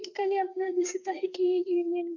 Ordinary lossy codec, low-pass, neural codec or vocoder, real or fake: none; 7.2 kHz; codec, 32 kHz, 1.9 kbps, SNAC; fake